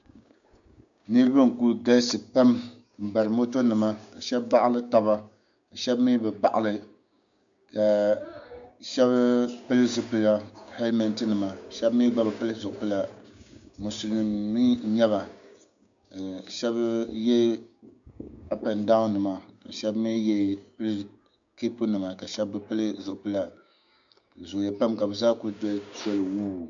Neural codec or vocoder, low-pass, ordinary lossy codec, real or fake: codec, 16 kHz, 6 kbps, DAC; 7.2 kHz; MP3, 64 kbps; fake